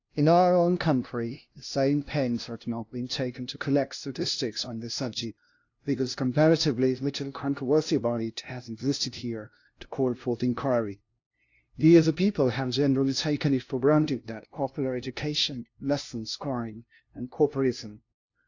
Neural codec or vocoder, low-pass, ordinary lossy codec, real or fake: codec, 16 kHz, 0.5 kbps, FunCodec, trained on LibriTTS, 25 frames a second; 7.2 kHz; AAC, 48 kbps; fake